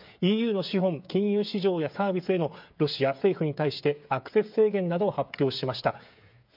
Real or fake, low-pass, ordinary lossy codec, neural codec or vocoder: fake; 5.4 kHz; MP3, 48 kbps; codec, 16 kHz, 8 kbps, FreqCodec, smaller model